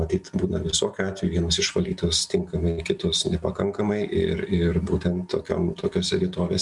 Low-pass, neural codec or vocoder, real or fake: 10.8 kHz; none; real